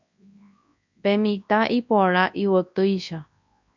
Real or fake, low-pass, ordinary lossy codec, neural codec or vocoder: fake; 7.2 kHz; MP3, 64 kbps; codec, 24 kHz, 0.9 kbps, WavTokenizer, large speech release